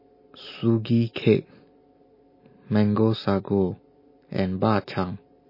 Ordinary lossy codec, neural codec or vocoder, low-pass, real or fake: MP3, 24 kbps; none; 5.4 kHz; real